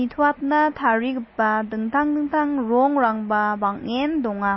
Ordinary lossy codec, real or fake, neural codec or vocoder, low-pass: MP3, 24 kbps; real; none; 7.2 kHz